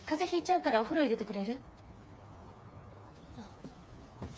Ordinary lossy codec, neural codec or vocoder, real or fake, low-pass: none; codec, 16 kHz, 4 kbps, FreqCodec, smaller model; fake; none